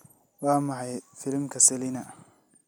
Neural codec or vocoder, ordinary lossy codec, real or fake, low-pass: none; none; real; none